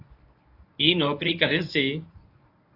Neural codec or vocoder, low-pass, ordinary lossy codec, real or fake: codec, 24 kHz, 0.9 kbps, WavTokenizer, medium speech release version 1; 5.4 kHz; AAC, 32 kbps; fake